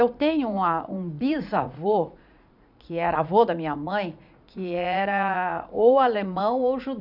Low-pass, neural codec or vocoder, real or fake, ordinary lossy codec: 5.4 kHz; vocoder, 44.1 kHz, 80 mel bands, Vocos; fake; none